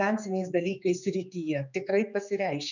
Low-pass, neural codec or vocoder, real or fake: 7.2 kHz; codec, 16 kHz, 4 kbps, X-Codec, HuBERT features, trained on general audio; fake